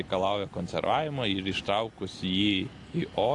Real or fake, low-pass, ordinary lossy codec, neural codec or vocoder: real; 10.8 kHz; AAC, 48 kbps; none